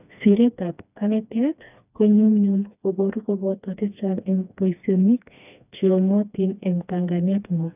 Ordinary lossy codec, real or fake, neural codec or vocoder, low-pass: none; fake; codec, 16 kHz, 2 kbps, FreqCodec, smaller model; 3.6 kHz